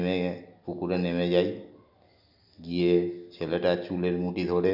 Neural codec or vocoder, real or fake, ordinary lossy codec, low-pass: none; real; none; 5.4 kHz